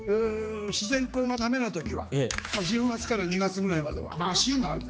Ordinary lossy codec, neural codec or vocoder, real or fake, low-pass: none; codec, 16 kHz, 2 kbps, X-Codec, HuBERT features, trained on general audio; fake; none